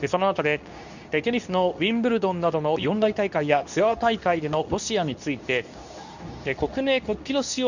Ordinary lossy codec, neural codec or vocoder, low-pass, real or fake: none; codec, 24 kHz, 0.9 kbps, WavTokenizer, medium speech release version 2; 7.2 kHz; fake